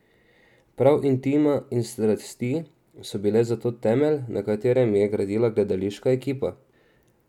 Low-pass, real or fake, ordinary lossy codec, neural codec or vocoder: 19.8 kHz; real; none; none